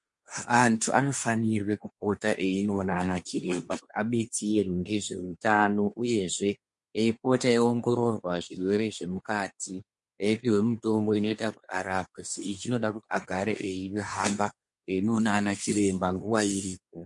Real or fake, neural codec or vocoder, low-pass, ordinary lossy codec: fake; codec, 24 kHz, 1 kbps, SNAC; 10.8 kHz; MP3, 48 kbps